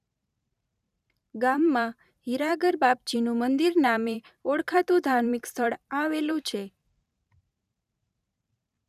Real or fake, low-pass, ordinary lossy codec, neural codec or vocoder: fake; 14.4 kHz; none; vocoder, 44.1 kHz, 128 mel bands every 512 samples, BigVGAN v2